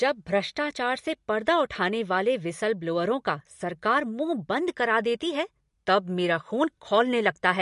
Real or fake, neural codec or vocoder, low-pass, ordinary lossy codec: real; none; 14.4 kHz; MP3, 48 kbps